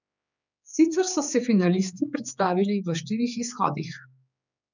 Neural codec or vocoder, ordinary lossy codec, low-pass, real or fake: codec, 16 kHz, 4 kbps, X-Codec, HuBERT features, trained on general audio; none; 7.2 kHz; fake